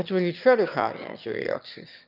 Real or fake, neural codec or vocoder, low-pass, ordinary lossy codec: fake; autoencoder, 22.05 kHz, a latent of 192 numbers a frame, VITS, trained on one speaker; 5.4 kHz; none